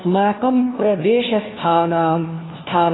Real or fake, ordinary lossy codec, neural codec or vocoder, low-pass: fake; AAC, 16 kbps; codec, 16 kHz, 1 kbps, FunCodec, trained on LibriTTS, 50 frames a second; 7.2 kHz